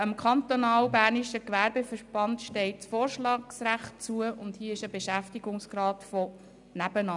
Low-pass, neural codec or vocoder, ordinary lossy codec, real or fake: 10.8 kHz; none; none; real